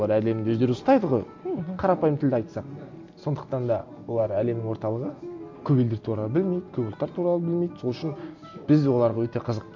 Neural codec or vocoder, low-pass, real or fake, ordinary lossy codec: none; 7.2 kHz; real; MP3, 64 kbps